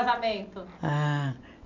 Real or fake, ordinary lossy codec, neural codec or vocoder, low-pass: real; none; none; 7.2 kHz